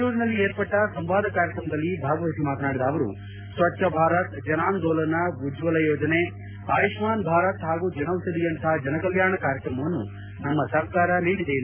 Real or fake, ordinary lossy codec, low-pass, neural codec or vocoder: real; MP3, 24 kbps; 3.6 kHz; none